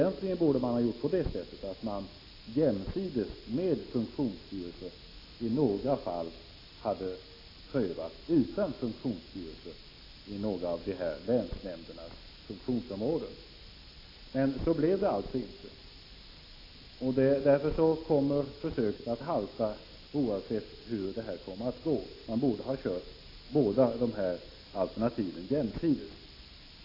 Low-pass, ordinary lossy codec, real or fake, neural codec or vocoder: 5.4 kHz; AAC, 32 kbps; real; none